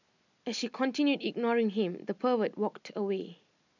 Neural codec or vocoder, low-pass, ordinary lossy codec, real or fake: none; 7.2 kHz; none; real